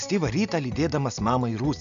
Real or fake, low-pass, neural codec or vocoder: real; 7.2 kHz; none